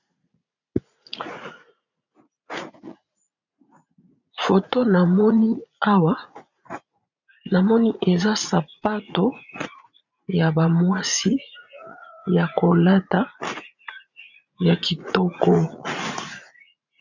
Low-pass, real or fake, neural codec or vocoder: 7.2 kHz; fake; vocoder, 44.1 kHz, 80 mel bands, Vocos